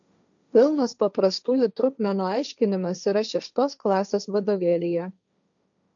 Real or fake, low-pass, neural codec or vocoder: fake; 7.2 kHz; codec, 16 kHz, 1.1 kbps, Voila-Tokenizer